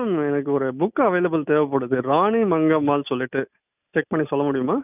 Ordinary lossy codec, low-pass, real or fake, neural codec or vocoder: none; 3.6 kHz; real; none